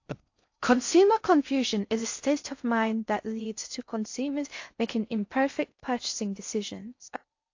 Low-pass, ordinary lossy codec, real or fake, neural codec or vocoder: 7.2 kHz; AAC, 48 kbps; fake; codec, 16 kHz in and 24 kHz out, 0.6 kbps, FocalCodec, streaming, 4096 codes